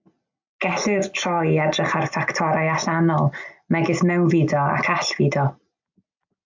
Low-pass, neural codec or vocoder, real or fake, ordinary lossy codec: 7.2 kHz; none; real; MP3, 64 kbps